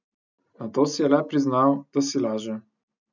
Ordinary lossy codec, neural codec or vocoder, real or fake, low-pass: none; none; real; 7.2 kHz